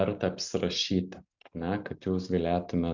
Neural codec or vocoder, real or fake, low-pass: none; real; 7.2 kHz